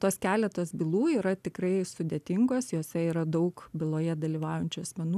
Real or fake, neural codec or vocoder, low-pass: real; none; 14.4 kHz